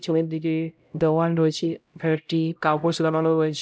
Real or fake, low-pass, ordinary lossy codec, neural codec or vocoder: fake; none; none; codec, 16 kHz, 0.5 kbps, X-Codec, HuBERT features, trained on LibriSpeech